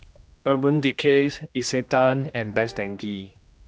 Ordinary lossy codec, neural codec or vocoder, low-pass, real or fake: none; codec, 16 kHz, 1 kbps, X-Codec, HuBERT features, trained on general audio; none; fake